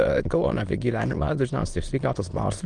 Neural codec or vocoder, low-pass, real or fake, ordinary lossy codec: autoencoder, 22.05 kHz, a latent of 192 numbers a frame, VITS, trained on many speakers; 9.9 kHz; fake; Opus, 24 kbps